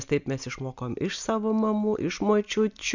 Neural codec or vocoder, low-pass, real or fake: none; 7.2 kHz; real